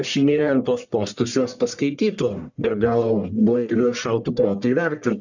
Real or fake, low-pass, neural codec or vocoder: fake; 7.2 kHz; codec, 44.1 kHz, 1.7 kbps, Pupu-Codec